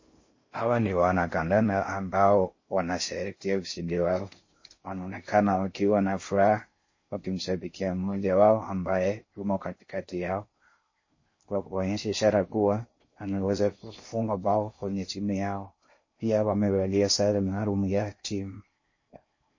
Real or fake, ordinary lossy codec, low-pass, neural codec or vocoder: fake; MP3, 32 kbps; 7.2 kHz; codec, 16 kHz in and 24 kHz out, 0.6 kbps, FocalCodec, streaming, 4096 codes